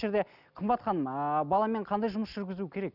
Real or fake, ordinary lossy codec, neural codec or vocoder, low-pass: real; none; none; 5.4 kHz